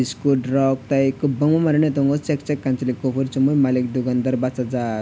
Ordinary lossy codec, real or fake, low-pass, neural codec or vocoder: none; real; none; none